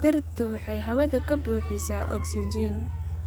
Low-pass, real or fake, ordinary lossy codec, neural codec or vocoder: none; fake; none; codec, 44.1 kHz, 2.6 kbps, SNAC